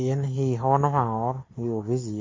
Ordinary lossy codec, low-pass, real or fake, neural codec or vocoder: MP3, 32 kbps; 7.2 kHz; real; none